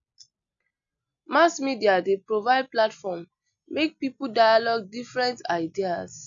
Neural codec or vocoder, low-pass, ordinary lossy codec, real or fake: none; 7.2 kHz; none; real